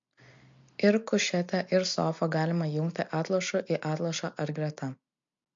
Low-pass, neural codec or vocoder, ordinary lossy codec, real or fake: 7.2 kHz; none; MP3, 48 kbps; real